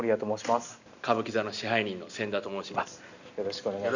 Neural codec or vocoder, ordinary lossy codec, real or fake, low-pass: none; none; real; 7.2 kHz